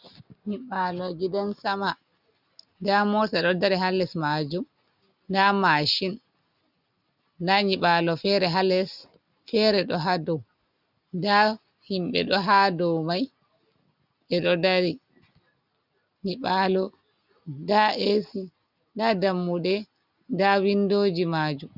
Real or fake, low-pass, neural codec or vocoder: real; 5.4 kHz; none